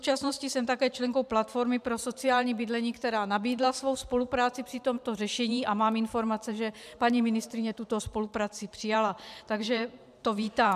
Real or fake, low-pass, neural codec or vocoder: fake; 14.4 kHz; vocoder, 44.1 kHz, 128 mel bands every 512 samples, BigVGAN v2